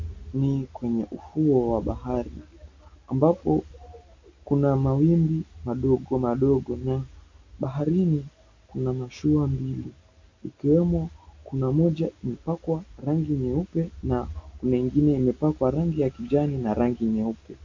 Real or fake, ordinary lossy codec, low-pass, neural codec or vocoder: real; MP3, 48 kbps; 7.2 kHz; none